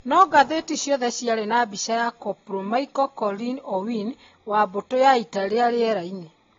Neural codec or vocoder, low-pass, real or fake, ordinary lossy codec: none; 7.2 kHz; real; AAC, 24 kbps